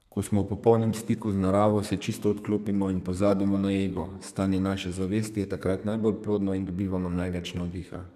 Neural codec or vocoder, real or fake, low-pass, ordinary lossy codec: codec, 32 kHz, 1.9 kbps, SNAC; fake; 14.4 kHz; none